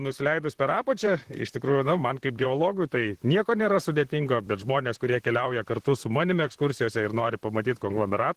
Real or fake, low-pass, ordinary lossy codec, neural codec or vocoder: fake; 14.4 kHz; Opus, 16 kbps; vocoder, 44.1 kHz, 128 mel bands, Pupu-Vocoder